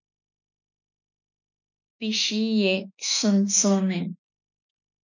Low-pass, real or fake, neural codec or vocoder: 7.2 kHz; fake; autoencoder, 48 kHz, 32 numbers a frame, DAC-VAE, trained on Japanese speech